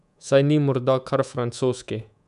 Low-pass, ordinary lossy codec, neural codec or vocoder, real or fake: 10.8 kHz; none; codec, 24 kHz, 3.1 kbps, DualCodec; fake